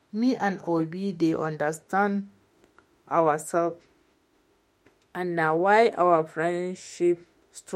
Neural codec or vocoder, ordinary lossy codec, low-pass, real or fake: autoencoder, 48 kHz, 32 numbers a frame, DAC-VAE, trained on Japanese speech; MP3, 64 kbps; 19.8 kHz; fake